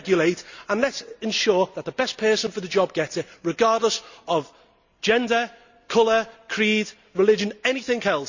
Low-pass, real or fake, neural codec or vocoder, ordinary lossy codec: 7.2 kHz; real; none; Opus, 64 kbps